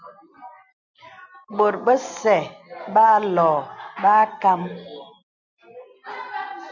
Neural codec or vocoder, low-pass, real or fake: none; 7.2 kHz; real